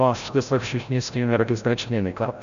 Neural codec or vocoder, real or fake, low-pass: codec, 16 kHz, 0.5 kbps, FreqCodec, larger model; fake; 7.2 kHz